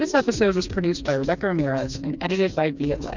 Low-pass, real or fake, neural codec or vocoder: 7.2 kHz; fake; codec, 16 kHz, 2 kbps, FreqCodec, smaller model